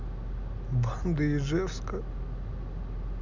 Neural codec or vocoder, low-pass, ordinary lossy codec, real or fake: none; 7.2 kHz; none; real